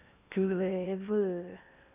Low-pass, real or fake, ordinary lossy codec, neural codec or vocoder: 3.6 kHz; fake; none; codec, 16 kHz in and 24 kHz out, 0.8 kbps, FocalCodec, streaming, 65536 codes